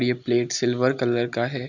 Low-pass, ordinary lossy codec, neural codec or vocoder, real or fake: 7.2 kHz; none; none; real